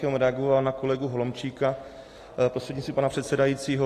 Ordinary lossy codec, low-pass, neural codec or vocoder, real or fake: AAC, 48 kbps; 14.4 kHz; none; real